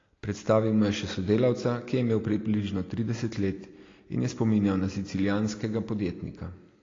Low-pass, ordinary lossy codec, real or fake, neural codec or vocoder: 7.2 kHz; AAC, 32 kbps; real; none